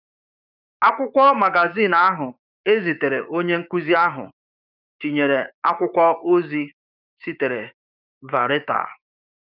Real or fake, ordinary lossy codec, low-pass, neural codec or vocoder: fake; none; 5.4 kHz; codec, 16 kHz, 6 kbps, DAC